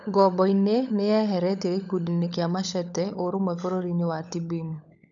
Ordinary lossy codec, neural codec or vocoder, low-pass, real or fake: none; codec, 16 kHz, 16 kbps, FunCodec, trained on LibriTTS, 50 frames a second; 7.2 kHz; fake